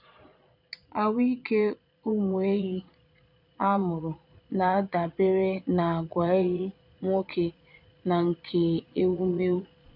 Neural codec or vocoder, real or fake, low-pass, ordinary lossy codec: vocoder, 22.05 kHz, 80 mel bands, Vocos; fake; 5.4 kHz; none